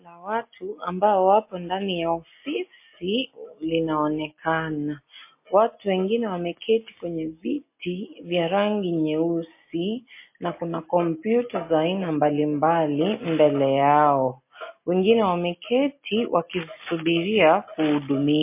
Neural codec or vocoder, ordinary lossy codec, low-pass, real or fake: none; MP3, 24 kbps; 3.6 kHz; real